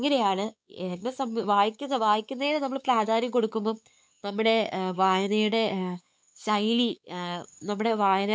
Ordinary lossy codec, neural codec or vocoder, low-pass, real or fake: none; codec, 16 kHz, 4 kbps, X-Codec, WavLM features, trained on Multilingual LibriSpeech; none; fake